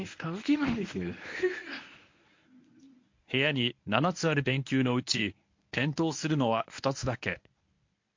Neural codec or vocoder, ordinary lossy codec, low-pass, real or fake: codec, 24 kHz, 0.9 kbps, WavTokenizer, medium speech release version 1; MP3, 48 kbps; 7.2 kHz; fake